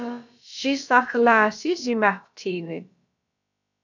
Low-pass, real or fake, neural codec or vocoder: 7.2 kHz; fake; codec, 16 kHz, about 1 kbps, DyCAST, with the encoder's durations